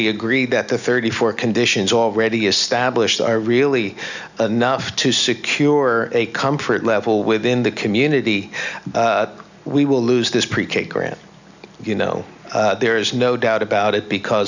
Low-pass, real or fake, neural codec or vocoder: 7.2 kHz; real; none